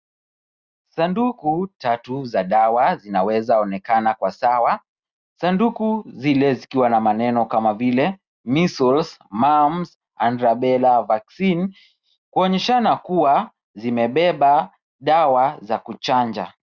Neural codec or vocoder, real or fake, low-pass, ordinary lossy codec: none; real; 7.2 kHz; Opus, 64 kbps